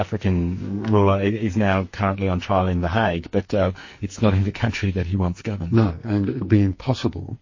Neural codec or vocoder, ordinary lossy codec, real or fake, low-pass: codec, 44.1 kHz, 2.6 kbps, SNAC; MP3, 32 kbps; fake; 7.2 kHz